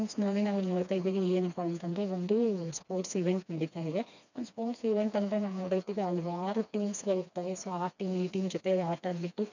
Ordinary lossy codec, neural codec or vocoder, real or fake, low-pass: none; codec, 16 kHz, 2 kbps, FreqCodec, smaller model; fake; 7.2 kHz